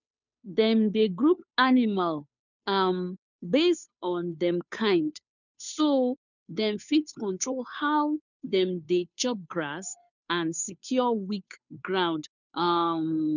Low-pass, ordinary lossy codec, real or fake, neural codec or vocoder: 7.2 kHz; none; fake; codec, 16 kHz, 2 kbps, FunCodec, trained on Chinese and English, 25 frames a second